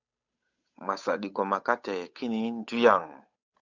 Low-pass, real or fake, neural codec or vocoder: 7.2 kHz; fake; codec, 16 kHz, 8 kbps, FunCodec, trained on Chinese and English, 25 frames a second